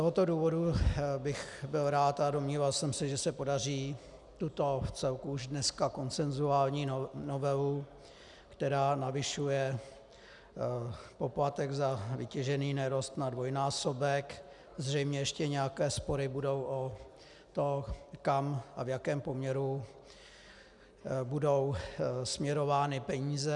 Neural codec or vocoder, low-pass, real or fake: none; 10.8 kHz; real